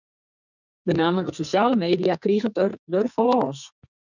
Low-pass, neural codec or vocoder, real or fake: 7.2 kHz; codec, 44.1 kHz, 2.6 kbps, SNAC; fake